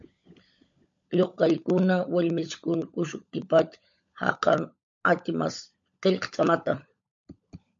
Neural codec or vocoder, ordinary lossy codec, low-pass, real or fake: codec, 16 kHz, 16 kbps, FunCodec, trained on LibriTTS, 50 frames a second; MP3, 48 kbps; 7.2 kHz; fake